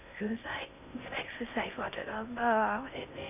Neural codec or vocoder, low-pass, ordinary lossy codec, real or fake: codec, 16 kHz in and 24 kHz out, 0.6 kbps, FocalCodec, streaming, 4096 codes; 3.6 kHz; none; fake